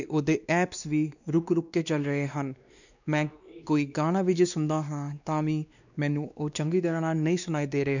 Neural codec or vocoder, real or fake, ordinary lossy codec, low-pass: codec, 16 kHz, 2 kbps, X-Codec, WavLM features, trained on Multilingual LibriSpeech; fake; none; 7.2 kHz